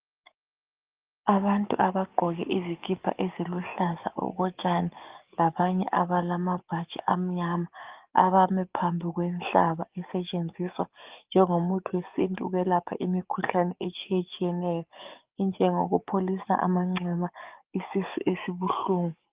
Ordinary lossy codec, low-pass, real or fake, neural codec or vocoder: Opus, 32 kbps; 3.6 kHz; fake; codec, 44.1 kHz, 7.8 kbps, DAC